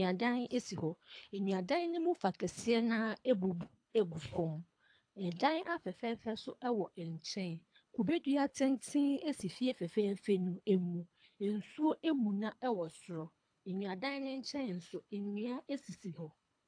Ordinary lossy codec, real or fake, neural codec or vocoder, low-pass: AAC, 64 kbps; fake; codec, 24 kHz, 3 kbps, HILCodec; 9.9 kHz